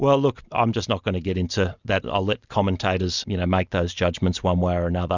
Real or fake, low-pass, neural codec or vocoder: real; 7.2 kHz; none